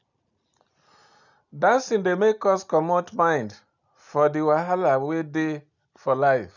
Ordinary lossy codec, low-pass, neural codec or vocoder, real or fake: none; 7.2 kHz; none; real